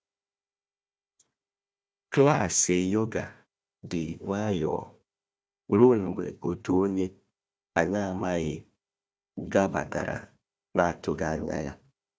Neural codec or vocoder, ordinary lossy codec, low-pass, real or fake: codec, 16 kHz, 1 kbps, FunCodec, trained on Chinese and English, 50 frames a second; none; none; fake